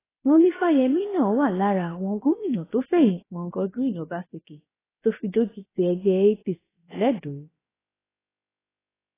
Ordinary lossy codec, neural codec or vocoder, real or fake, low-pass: AAC, 16 kbps; codec, 16 kHz, about 1 kbps, DyCAST, with the encoder's durations; fake; 3.6 kHz